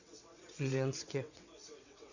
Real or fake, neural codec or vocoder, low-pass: fake; vocoder, 22.05 kHz, 80 mel bands, WaveNeXt; 7.2 kHz